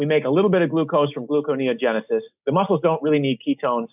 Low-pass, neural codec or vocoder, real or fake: 3.6 kHz; none; real